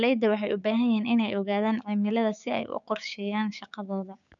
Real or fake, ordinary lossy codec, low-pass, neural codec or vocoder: fake; MP3, 96 kbps; 7.2 kHz; codec, 16 kHz, 6 kbps, DAC